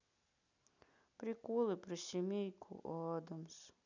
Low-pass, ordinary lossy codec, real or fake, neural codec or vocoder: 7.2 kHz; none; real; none